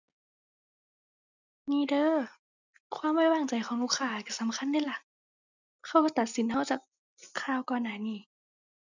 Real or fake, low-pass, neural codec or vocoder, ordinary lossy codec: real; 7.2 kHz; none; none